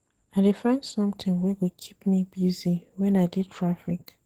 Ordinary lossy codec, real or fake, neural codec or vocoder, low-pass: Opus, 16 kbps; fake; codec, 44.1 kHz, 7.8 kbps, DAC; 19.8 kHz